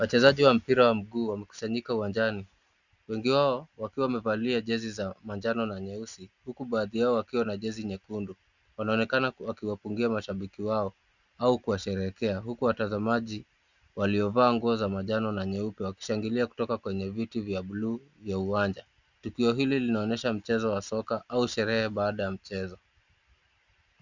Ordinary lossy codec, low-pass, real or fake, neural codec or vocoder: Opus, 64 kbps; 7.2 kHz; real; none